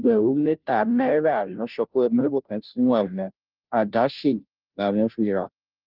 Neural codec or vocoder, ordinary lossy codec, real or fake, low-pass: codec, 16 kHz, 0.5 kbps, FunCodec, trained on Chinese and English, 25 frames a second; Opus, 24 kbps; fake; 5.4 kHz